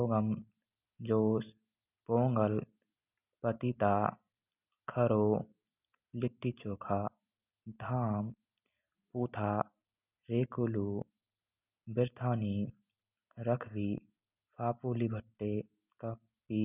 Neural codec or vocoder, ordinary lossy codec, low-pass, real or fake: none; none; 3.6 kHz; real